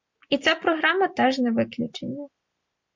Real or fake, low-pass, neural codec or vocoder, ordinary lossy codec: real; 7.2 kHz; none; MP3, 48 kbps